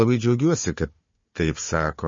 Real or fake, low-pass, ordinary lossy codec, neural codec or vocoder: fake; 7.2 kHz; MP3, 32 kbps; codec, 16 kHz, 4 kbps, FunCodec, trained on Chinese and English, 50 frames a second